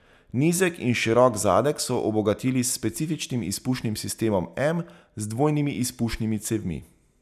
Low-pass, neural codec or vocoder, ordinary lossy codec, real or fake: 14.4 kHz; none; none; real